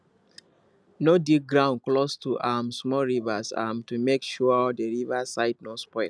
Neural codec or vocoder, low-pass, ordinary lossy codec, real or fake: none; none; none; real